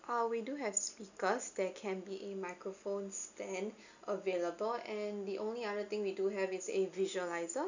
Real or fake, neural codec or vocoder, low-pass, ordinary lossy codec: real; none; 7.2 kHz; none